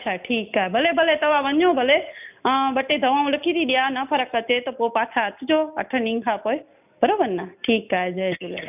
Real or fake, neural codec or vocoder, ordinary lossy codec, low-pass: real; none; none; 3.6 kHz